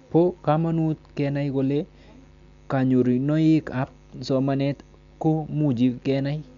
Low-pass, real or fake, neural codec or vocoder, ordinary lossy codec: 7.2 kHz; real; none; none